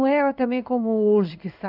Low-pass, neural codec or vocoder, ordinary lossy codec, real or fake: 5.4 kHz; codec, 16 kHz in and 24 kHz out, 1 kbps, XY-Tokenizer; none; fake